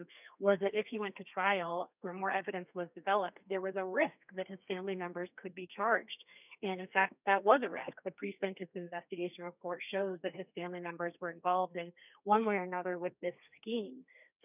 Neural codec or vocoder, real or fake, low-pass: codec, 32 kHz, 1.9 kbps, SNAC; fake; 3.6 kHz